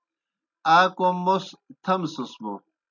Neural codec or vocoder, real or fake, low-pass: none; real; 7.2 kHz